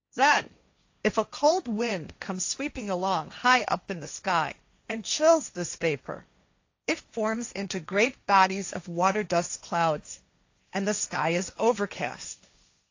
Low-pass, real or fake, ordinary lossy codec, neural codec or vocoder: 7.2 kHz; fake; AAC, 48 kbps; codec, 16 kHz, 1.1 kbps, Voila-Tokenizer